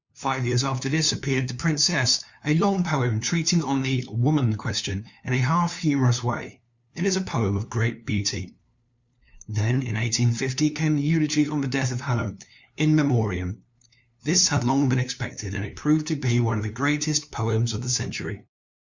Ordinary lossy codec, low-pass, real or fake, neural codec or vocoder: Opus, 64 kbps; 7.2 kHz; fake; codec, 16 kHz, 2 kbps, FunCodec, trained on LibriTTS, 25 frames a second